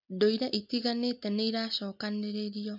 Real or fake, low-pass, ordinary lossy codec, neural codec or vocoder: real; 5.4 kHz; MP3, 48 kbps; none